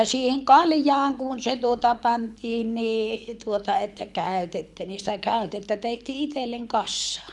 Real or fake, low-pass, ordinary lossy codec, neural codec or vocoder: fake; none; none; codec, 24 kHz, 6 kbps, HILCodec